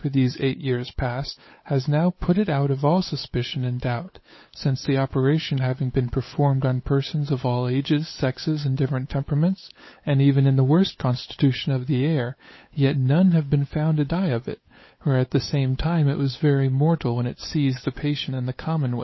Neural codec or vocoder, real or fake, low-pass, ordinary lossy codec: codec, 16 kHz, 8 kbps, FunCodec, trained on Chinese and English, 25 frames a second; fake; 7.2 kHz; MP3, 24 kbps